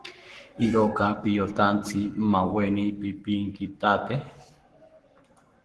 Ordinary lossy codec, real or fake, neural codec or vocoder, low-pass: Opus, 16 kbps; fake; codec, 44.1 kHz, 7.8 kbps, Pupu-Codec; 10.8 kHz